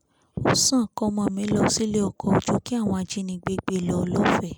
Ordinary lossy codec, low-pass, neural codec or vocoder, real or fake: none; none; none; real